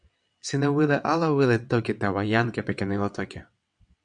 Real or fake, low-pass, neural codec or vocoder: fake; 9.9 kHz; vocoder, 22.05 kHz, 80 mel bands, WaveNeXt